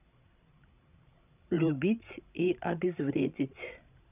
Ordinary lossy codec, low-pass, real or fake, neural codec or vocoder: none; 3.6 kHz; fake; codec, 16 kHz, 16 kbps, FreqCodec, larger model